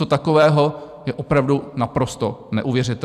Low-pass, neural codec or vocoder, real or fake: 14.4 kHz; vocoder, 44.1 kHz, 128 mel bands every 256 samples, BigVGAN v2; fake